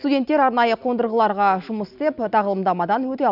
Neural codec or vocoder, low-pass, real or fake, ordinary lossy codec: none; 5.4 kHz; real; none